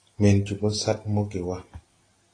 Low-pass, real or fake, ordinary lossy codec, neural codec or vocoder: 9.9 kHz; real; AAC, 32 kbps; none